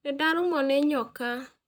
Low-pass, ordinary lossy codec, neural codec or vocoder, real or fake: none; none; vocoder, 44.1 kHz, 128 mel bands, Pupu-Vocoder; fake